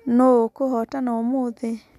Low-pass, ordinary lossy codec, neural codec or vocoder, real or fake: 14.4 kHz; none; none; real